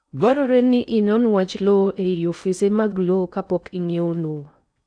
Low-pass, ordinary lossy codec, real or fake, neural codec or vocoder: 9.9 kHz; none; fake; codec, 16 kHz in and 24 kHz out, 0.6 kbps, FocalCodec, streaming, 4096 codes